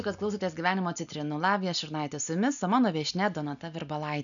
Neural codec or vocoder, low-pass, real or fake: none; 7.2 kHz; real